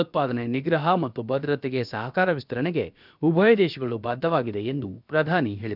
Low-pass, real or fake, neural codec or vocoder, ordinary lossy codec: 5.4 kHz; fake; codec, 16 kHz, about 1 kbps, DyCAST, with the encoder's durations; none